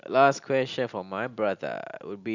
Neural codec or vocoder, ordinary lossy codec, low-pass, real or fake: none; none; 7.2 kHz; real